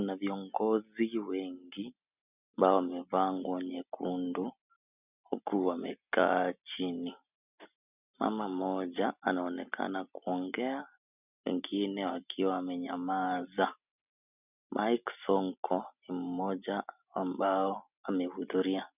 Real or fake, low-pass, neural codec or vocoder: real; 3.6 kHz; none